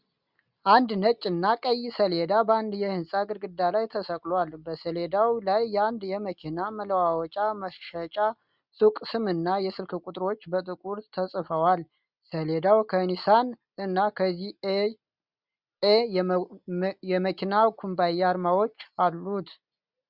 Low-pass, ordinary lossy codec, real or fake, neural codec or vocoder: 5.4 kHz; Opus, 64 kbps; real; none